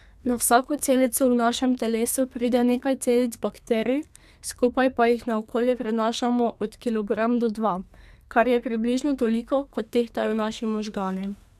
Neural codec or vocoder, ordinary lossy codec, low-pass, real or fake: codec, 32 kHz, 1.9 kbps, SNAC; none; 14.4 kHz; fake